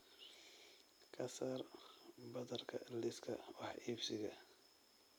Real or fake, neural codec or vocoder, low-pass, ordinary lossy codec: real; none; none; none